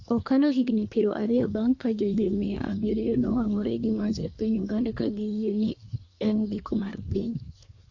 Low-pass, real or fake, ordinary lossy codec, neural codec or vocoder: 7.2 kHz; fake; AAC, 48 kbps; codec, 24 kHz, 1 kbps, SNAC